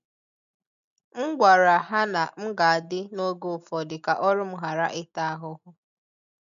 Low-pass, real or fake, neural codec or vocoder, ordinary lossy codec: 7.2 kHz; real; none; none